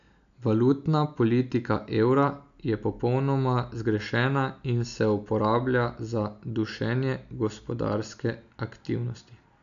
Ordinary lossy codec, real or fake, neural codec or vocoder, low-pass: none; real; none; 7.2 kHz